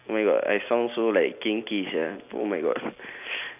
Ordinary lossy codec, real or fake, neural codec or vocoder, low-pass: none; real; none; 3.6 kHz